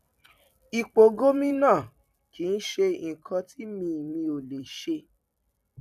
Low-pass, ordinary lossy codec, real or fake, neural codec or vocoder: 14.4 kHz; none; fake; vocoder, 44.1 kHz, 128 mel bands every 256 samples, BigVGAN v2